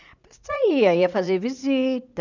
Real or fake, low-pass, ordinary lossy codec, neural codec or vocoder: fake; 7.2 kHz; none; vocoder, 44.1 kHz, 80 mel bands, Vocos